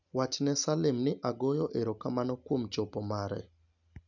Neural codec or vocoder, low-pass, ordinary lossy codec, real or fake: none; 7.2 kHz; none; real